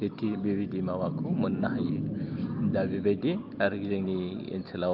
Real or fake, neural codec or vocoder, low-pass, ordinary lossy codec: real; none; 5.4 kHz; Opus, 24 kbps